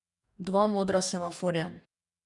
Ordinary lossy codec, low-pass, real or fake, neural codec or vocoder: none; 10.8 kHz; fake; codec, 44.1 kHz, 2.6 kbps, DAC